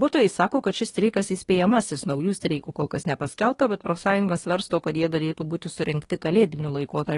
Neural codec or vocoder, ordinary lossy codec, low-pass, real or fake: codec, 24 kHz, 1 kbps, SNAC; AAC, 32 kbps; 10.8 kHz; fake